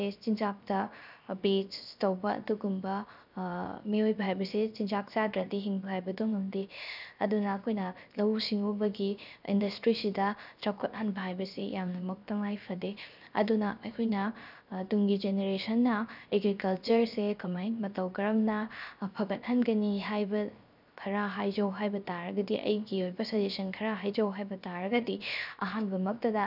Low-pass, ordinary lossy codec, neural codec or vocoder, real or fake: 5.4 kHz; none; codec, 16 kHz, 0.7 kbps, FocalCodec; fake